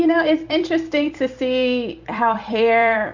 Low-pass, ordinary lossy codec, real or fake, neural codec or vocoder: 7.2 kHz; Opus, 64 kbps; fake; vocoder, 44.1 kHz, 128 mel bands every 256 samples, BigVGAN v2